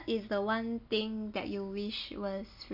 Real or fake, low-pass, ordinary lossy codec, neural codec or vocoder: real; 5.4 kHz; none; none